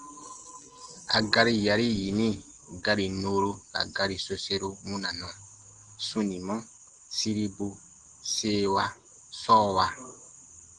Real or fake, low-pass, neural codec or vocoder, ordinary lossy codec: real; 10.8 kHz; none; Opus, 16 kbps